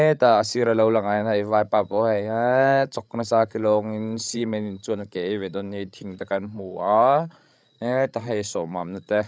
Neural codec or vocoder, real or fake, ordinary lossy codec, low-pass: codec, 16 kHz, 16 kbps, FreqCodec, larger model; fake; none; none